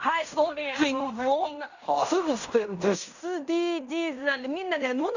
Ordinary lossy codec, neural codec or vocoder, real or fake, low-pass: none; codec, 16 kHz in and 24 kHz out, 0.9 kbps, LongCat-Audio-Codec, fine tuned four codebook decoder; fake; 7.2 kHz